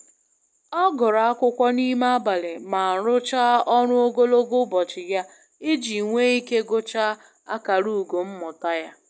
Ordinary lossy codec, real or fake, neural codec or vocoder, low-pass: none; real; none; none